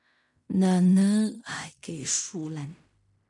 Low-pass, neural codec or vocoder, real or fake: 10.8 kHz; codec, 16 kHz in and 24 kHz out, 0.4 kbps, LongCat-Audio-Codec, fine tuned four codebook decoder; fake